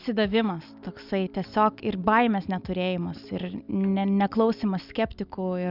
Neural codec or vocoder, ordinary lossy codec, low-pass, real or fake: none; Opus, 64 kbps; 5.4 kHz; real